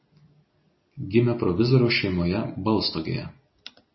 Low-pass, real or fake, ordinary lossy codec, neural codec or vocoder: 7.2 kHz; real; MP3, 24 kbps; none